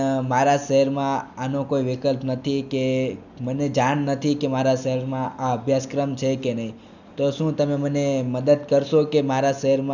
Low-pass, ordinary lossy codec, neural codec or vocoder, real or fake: 7.2 kHz; none; none; real